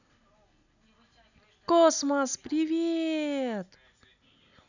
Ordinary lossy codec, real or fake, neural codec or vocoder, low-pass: none; real; none; 7.2 kHz